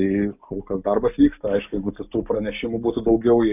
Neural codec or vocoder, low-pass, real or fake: none; 3.6 kHz; real